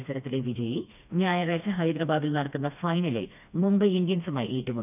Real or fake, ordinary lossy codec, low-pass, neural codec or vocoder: fake; none; 3.6 kHz; codec, 16 kHz, 2 kbps, FreqCodec, smaller model